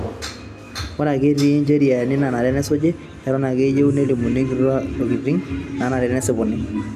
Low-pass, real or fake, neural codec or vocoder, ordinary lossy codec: 14.4 kHz; real; none; MP3, 96 kbps